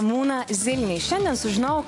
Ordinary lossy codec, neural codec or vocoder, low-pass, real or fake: AAC, 48 kbps; none; 10.8 kHz; real